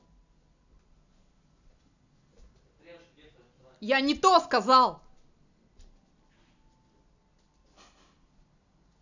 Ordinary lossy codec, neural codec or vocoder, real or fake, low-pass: none; none; real; 7.2 kHz